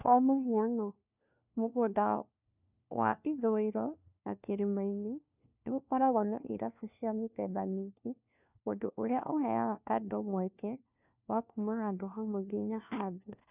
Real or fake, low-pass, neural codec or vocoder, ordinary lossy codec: fake; 3.6 kHz; codec, 16 kHz, 1 kbps, FunCodec, trained on LibriTTS, 50 frames a second; none